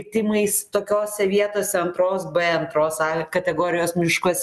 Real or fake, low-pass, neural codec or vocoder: real; 14.4 kHz; none